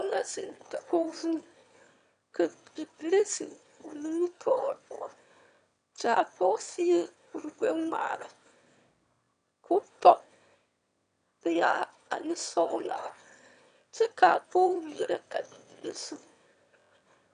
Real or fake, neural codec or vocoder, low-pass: fake; autoencoder, 22.05 kHz, a latent of 192 numbers a frame, VITS, trained on one speaker; 9.9 kHz